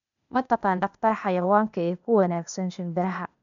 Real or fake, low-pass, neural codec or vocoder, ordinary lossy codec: fake; 7.2 kHz; codec, 16 kHz, 0.8 kbps, ZipCodec; MP3, 96 kbps